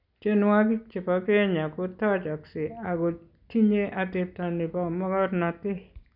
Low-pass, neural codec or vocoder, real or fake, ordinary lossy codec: 5.4 kHz; none; real; none